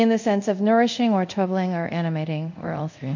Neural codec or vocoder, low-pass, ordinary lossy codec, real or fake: codec, 24 kHz, 0.5 kbps, DualCodec; 7.2 kHz; MP3, 48 kbps; fake